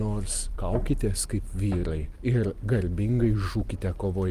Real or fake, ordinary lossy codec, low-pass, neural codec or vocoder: real; Opus, 32 kbps; 14.4 kHz; none